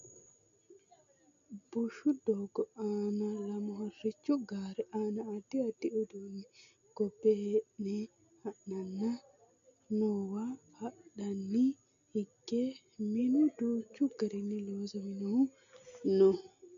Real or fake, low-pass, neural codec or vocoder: real; 7.2 kHz; none